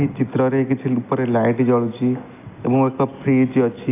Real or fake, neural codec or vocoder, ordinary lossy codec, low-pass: real; none; AAC, 24 kbps; 3.6 kHz